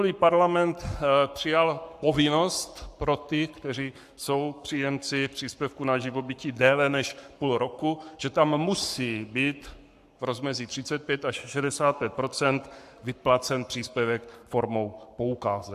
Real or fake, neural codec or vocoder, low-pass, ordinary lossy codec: fake; codec, 44.1 kHz, 7.8 kbps, Pupu-Codec; 14.4 kHz; AAC, 96 kbps